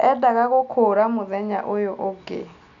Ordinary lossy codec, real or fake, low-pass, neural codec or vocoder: none; real; 7.2 kHz; none